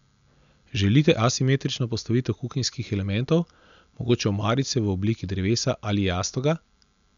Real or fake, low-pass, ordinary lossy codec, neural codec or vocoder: real; 7.2 kHz; none; none